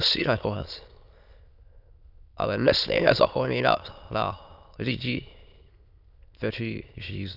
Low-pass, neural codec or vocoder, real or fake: 5.4 kHz; autoencoder, 22.05 kHz, a latent of 192 numbers a frame, VITS, trained on many speakers; fake